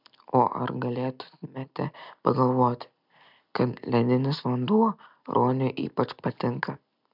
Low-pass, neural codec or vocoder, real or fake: 5.4 kHz; none; real